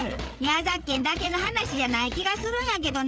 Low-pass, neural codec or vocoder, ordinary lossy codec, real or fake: none; codec, 16 kHz, 8 kbps, FreqCodec, larger model; none; fake